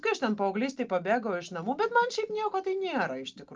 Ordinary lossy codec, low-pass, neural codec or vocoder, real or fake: Opus, 32 kbps; 7.2 kHz; none; real